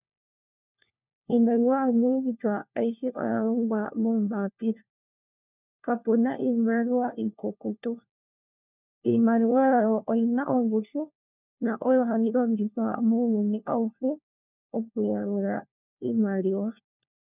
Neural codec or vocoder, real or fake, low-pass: codec, 16 kHz, 1 kbps, FunCodec, trained on LibriTTS, 50 frames a second; fake; 3.6 kHz